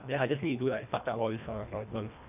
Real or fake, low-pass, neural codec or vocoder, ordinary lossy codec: fake; 3.6 kHz; codec, 24 kHz, 1.5 kbps, HILCodec; none